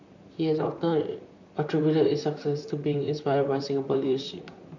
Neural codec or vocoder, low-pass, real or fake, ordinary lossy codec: vocoder, 44.1 kHz, 128 mel bands, Pupu-Vocoder; 7.2 kHz; fake; none